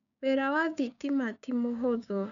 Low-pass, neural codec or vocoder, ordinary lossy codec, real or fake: 7.2 kHz; codec, 16 kHz, 6 kbps, DAC; none; fake